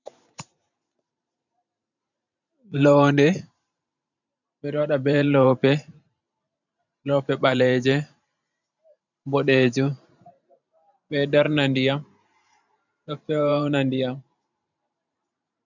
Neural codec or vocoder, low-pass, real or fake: vocoder, 44.1 kHz, 128 mel bands every 512 samples, BigVGAN v2; 7.2 kHz; fake